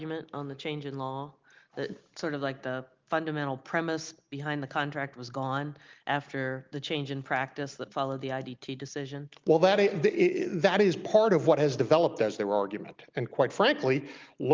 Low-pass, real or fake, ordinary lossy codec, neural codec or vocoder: 7.2 kHz; real; Opus, 32 kbps; none